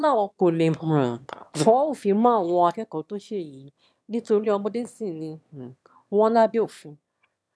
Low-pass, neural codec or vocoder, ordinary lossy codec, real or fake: none; autoencoder, 22.05 kHz, a latent of 192 numbers a frame, VITS, trained on one speaker; none; fake